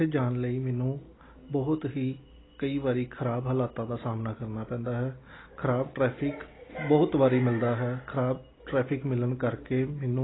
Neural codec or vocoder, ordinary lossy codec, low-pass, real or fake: none; AAC, 16 kbps; 7.2 kHz; real